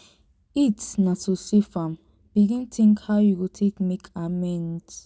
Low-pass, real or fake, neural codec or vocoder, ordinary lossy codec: none; real; none; none